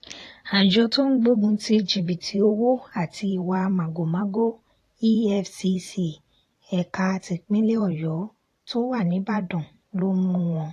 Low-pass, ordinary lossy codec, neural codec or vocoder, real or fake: 14.4 kHz; AAC, 48 kbps; vocoder, 44.1 kHz, 128 mel bands every 512 samples, BigVGAN v2; fake